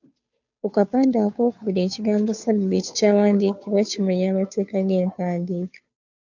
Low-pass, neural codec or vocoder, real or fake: 7.2 kHz; codec, 16 kHz, 2 kbps, FunCodec, trained on Chinese and English, 25 frames a second; fake